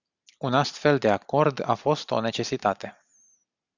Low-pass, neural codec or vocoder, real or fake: 7.2 kHz; none; real